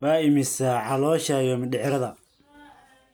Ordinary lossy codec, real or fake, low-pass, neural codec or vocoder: none; real; none; none